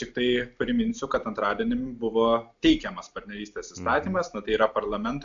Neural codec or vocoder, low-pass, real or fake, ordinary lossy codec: none; 7.2 kHz; real; AAC, 64 kbps